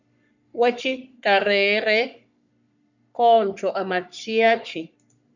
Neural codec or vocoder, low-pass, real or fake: codec, 44.1 kHz, 3.4 kbps, Pupu-Codec; 7.2 kHz; fake